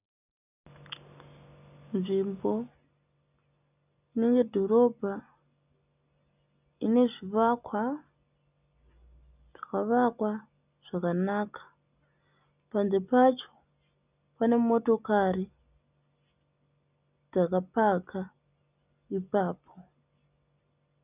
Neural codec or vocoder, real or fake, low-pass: none; real; 3.6 kHz